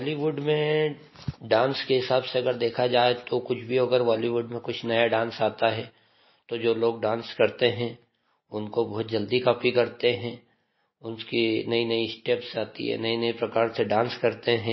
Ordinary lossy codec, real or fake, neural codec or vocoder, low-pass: MP3, 24 kbps; real; none; 7.2 kHz